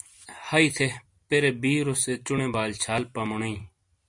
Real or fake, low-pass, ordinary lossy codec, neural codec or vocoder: real; 10.8 kHz; MP3, 48 kbps; none